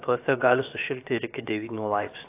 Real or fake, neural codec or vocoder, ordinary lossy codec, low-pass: fake; codec, 16 kHz, about 1 kbps, DyCAST, with the encoder's durations; AAC, 24 kbps; 3.6 kHz